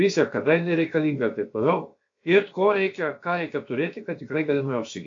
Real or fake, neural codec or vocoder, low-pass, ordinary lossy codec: fake; codec, 16 kHz, about 1 kbps, DyCAST, with the encoder's durations; 7.2 kHz; AAC, 48 kbps